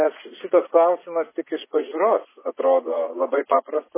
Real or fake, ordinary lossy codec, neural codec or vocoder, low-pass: fake; MP3, 16 kbps; vocoder, 44.1 kHz, 80 mel bands, Vocos; 3.6 kHz